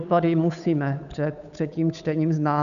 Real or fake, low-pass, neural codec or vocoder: fake; 7.2 kHz; codec, 16 kHz, 8 kbps, FunCodec, trained on Chinese and English, 25 frames a second